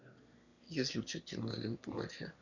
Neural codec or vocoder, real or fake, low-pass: autoencoder, 22.05 kHz, a latent of 192 numbers a frame, VITS, trained on one speaker; fake; 7.2 kHz